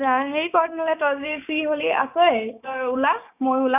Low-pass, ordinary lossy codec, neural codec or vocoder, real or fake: 3.6 kHz; none; codec, 16 kHz, 6 kbps, DAC; fake